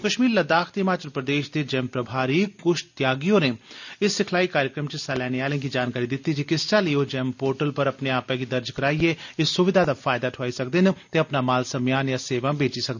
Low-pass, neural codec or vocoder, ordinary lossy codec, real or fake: 7.2 kHz; none; none; real